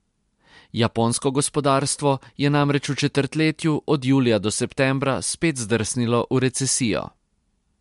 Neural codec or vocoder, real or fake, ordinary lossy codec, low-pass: none; real; MP3, 64 kbps; 10.8 kHz